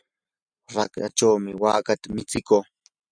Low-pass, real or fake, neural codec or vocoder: 9.9 kHz; real; none